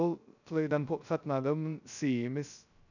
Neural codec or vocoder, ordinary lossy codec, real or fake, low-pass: codec, 16 kHz, 0.2 kbps, FocalCodec; none; fake; 7.2 kHz